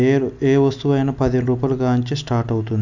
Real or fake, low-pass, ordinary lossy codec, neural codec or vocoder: real; 7.2 kHz; none; none